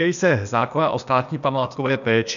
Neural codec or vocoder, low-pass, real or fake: codec, 16 kHz, 0.8 kbps, ZipCodec; 7.2 kHz; fake